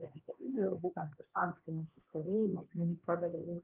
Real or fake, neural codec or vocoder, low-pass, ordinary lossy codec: fake; codec, 16 kHz, 2 kbps, X-Codec, HuBERT features, trained on LibriSpeech; 3.6 kHz; Opus, 24 kbps